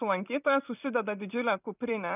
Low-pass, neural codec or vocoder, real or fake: 3.6 kHz; vocoder, 44.1 kHz, 128 mel bands every 256 samples, BigVGAN v2; fake